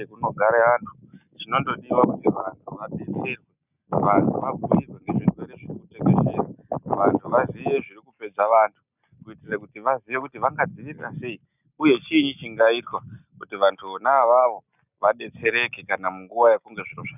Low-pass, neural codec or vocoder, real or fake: 3.6 kHz; none; real